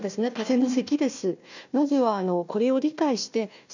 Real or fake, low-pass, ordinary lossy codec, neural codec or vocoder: fake; 7.2 kHz; none; codec, 16 kHz, 1 kbps, FunCodec, trained on Chinese and English, 50 frames a second